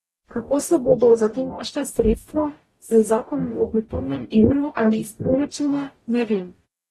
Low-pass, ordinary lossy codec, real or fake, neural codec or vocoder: 19.8 kHz; AAC, 32 kbps; fake; codec, 44.1 kHz, 0.9 kbps, DAC